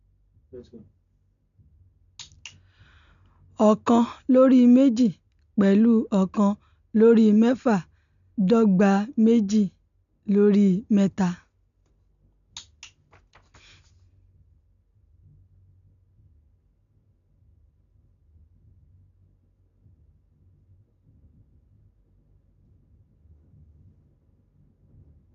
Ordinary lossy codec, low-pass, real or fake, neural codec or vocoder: none; 7.2 kHz; real; none